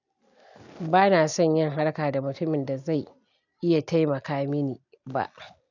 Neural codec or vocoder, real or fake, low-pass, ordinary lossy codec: none; real; 7.2 kHz; none